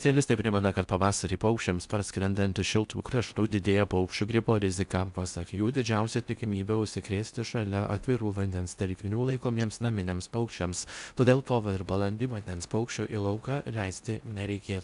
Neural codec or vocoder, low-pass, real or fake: codec, 16 kHz in and 24 kHz out, 0.6 kbps, FocalCodec, streaming, 4096 codes; 10.8 kHz; fake